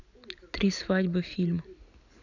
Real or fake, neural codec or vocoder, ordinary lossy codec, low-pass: real; none; none; 7.2 kHz